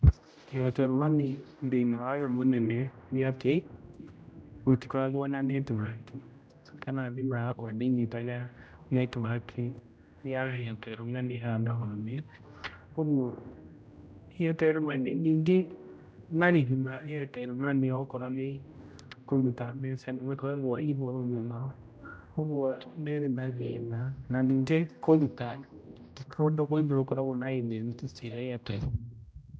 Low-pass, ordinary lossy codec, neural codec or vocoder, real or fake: none; none; codec, 16 kHz, 0.5 kbps, X-Codec, HuBERT features, trained on general audio; fake